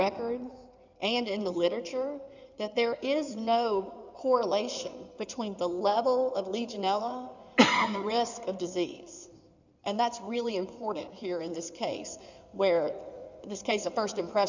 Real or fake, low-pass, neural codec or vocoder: fake; 7.2 kHz; codec, 16 kHz in and 24 kHz out, 2.2 kbps, FireRedTTS-2 codec